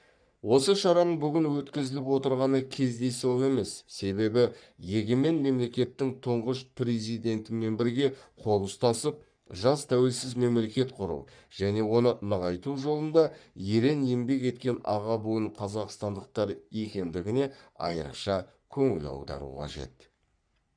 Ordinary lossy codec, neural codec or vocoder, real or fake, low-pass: none; codec, 44.1 kHz, 3.4 kbps, Pupu-Codec; fake; 9.9 kHz